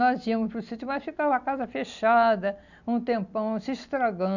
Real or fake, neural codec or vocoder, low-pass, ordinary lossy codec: real; none; 7.2 kHz; none